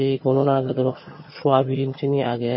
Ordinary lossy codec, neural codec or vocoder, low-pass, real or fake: MP3, 24 kbps; vocoder, 22.05 kHz, 80 mel bands, HiFi-GAN; 7.2 kHz; fake